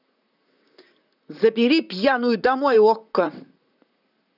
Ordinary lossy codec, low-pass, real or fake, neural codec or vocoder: none; 5.4 kHz; fake; vocoder, 44.1 kHz, 128 mel bands, Pupu-Vocoder